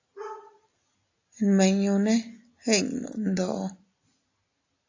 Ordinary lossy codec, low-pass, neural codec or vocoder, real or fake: AAC, 48 kbps; 7.2 kHz; none; real